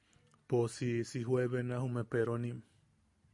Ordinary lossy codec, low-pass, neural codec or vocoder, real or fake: MP3, 96 kbps; 10.8 kHz; none; real